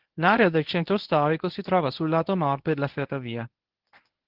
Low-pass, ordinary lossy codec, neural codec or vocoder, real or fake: 5.4 kHz; Opus, 16 kbps; codec, 24 kHz, 0.9 kbps, WavTokenizer, medium speech release version 1; fake